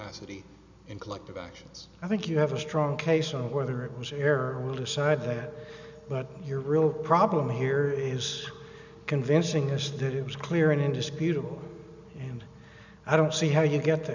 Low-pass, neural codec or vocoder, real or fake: 7.2 kHz; none; real